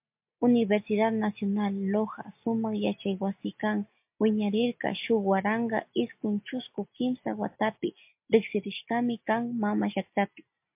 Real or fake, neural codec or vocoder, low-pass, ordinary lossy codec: real; none; 3.6 kHz; MP3, 32 kbps